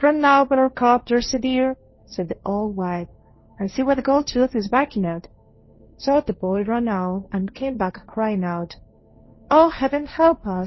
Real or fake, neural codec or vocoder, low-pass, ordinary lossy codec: fake; codec, 16 kHz, 1.1 kbps, Voila-Tokenizer; 7.2 kHz; MP3, 24 kbps